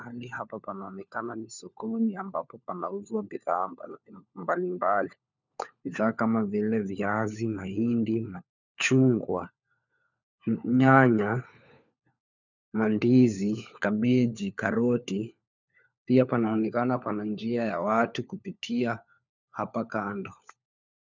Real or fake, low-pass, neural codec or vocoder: fake; 7.2 kHz; codec, 16 kHz, 4 kbps, FunCodec, trained on LibriTTS, 50 frames a second